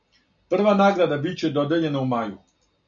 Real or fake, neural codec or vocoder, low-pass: real; none; 7.2 kHz